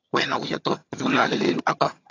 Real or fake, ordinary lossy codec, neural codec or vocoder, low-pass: fake; AAC, 32 kbps; vocoder, 22.05 kHz, 80 mel bands, HiFi-GAN; 7.2 kHz